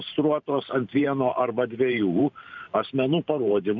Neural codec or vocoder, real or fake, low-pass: vocoder, 44.1 kHz, 128 mel bands every 512 samples, BigVGAN v2; fake; 7.2 kHz